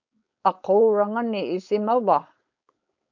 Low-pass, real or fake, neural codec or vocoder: 7.2 kHz; fake; codec, 16 kHz, 4.8 kbps, FACodec